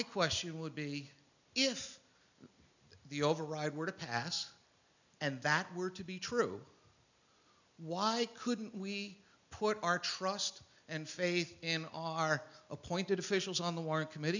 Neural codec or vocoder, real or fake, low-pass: none; real; 7.2 kHz